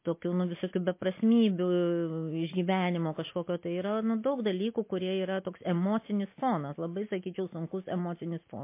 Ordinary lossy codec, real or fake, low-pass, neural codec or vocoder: MP3, 24 kbps; real; 3.6 kHz; none